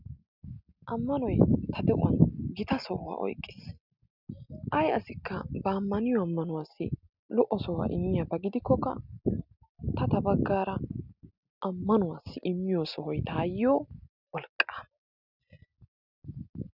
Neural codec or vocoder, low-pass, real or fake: none; 5.4 kHz; real